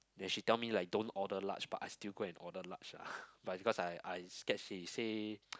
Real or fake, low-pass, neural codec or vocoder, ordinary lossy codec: real; none; none; none